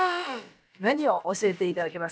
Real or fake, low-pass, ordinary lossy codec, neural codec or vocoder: fake; none; none; codec, 16 kHz, about 1 kbps, DyCAST, with the encoder's durations